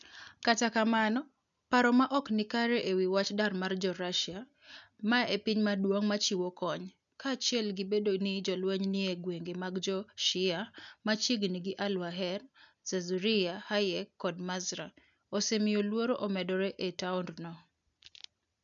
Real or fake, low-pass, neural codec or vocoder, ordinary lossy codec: real; 7.2 kHz; none; none